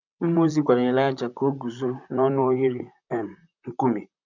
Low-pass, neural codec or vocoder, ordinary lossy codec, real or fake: 7.2 kHz; vocoder, 44.1 kHz, 128 mel bands, Pupu-Vocoder; none; fake